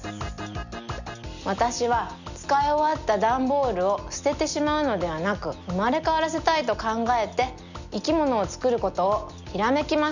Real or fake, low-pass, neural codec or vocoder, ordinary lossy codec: real; 7.2 kHz; none; none